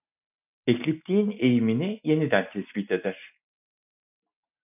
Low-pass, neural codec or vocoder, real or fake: 3.6 kHz; none; real